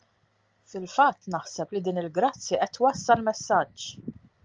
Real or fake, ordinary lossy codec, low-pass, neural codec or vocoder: real; Opus, 32 kbps; 7.2 kHz; none